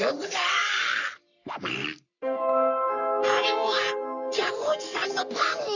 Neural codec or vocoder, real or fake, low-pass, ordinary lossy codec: codec, 44.1 kHz, 3.4 kbps, Pupu-Codec; fake; 7.2 kHz; none